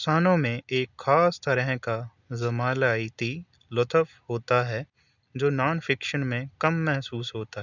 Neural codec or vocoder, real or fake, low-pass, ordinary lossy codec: none; real; 7.2 kHz; none